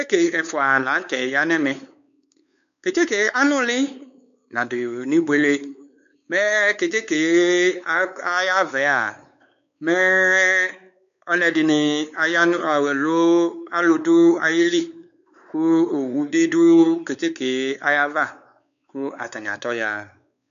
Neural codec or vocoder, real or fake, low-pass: codec, 16 kHz, 4 kbps, X-Codec, WavLM features, trained on Multilingual LibriSpeech; fake; 7.2 kHz